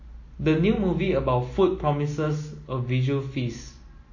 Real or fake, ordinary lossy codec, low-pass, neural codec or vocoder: real; MP3, 32 kbps; 7.2 kHz; none